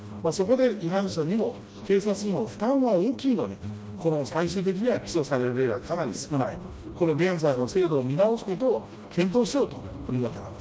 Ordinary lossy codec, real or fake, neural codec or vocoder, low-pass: none; fake; codec, 16 kHz, 1 kbps, FreqCodec, smaller model; none